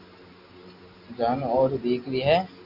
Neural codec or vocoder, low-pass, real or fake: none; 5.4 kHz; real